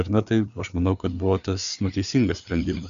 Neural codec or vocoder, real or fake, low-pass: codec, 16 kHz, 4 kbps, FreqCodec, larger model; fake; 7.2 kHz